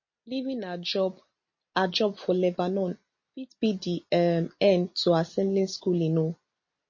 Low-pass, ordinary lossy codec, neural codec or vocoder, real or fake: 7.2 kHz; MP3, 32 kbps; none; real